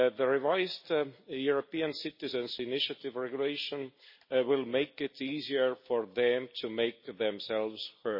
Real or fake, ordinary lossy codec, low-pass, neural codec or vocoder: real; MP3, 24 kbps; 5.4 kHz; none